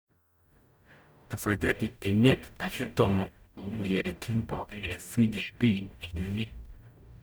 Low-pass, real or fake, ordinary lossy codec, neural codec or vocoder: none; fake; none; codec, 44.1 kHz, 0.9 kbps, DAC